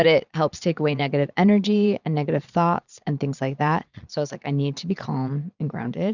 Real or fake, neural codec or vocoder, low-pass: fake; vocoder, 22.05 kHz, 80 mel bands, Vocos; 7.2 kHz